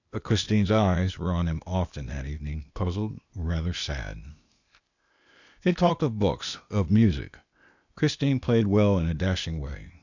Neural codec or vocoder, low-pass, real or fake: codec, 16 kHz, 0.8 kbps, ZipCodec; 7.2 kHz; fake